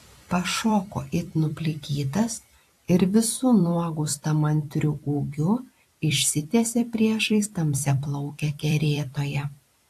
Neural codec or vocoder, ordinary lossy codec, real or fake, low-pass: vocoder, 44.1 kHz, 128 mel bands every 256 samples, BigVGAN v2; AAC, 64 kbps; fake; 14.4 kHz